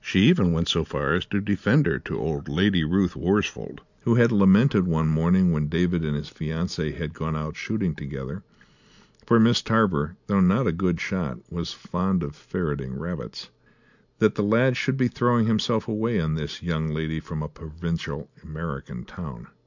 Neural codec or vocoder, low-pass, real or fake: none; 7.2 kHz; real